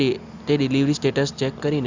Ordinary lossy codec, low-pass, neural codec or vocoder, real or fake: Opus, 64 kbps; 7.2 kHz; none; real